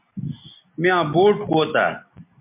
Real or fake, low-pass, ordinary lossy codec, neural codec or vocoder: real; 3.6 kHz; MP3, 24 kbps; none